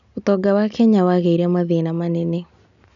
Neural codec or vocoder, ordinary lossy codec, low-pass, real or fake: none; none; 7.2 kHz; real